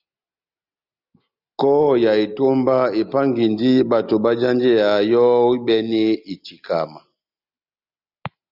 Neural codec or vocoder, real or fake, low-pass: none; real; 5.4 kHz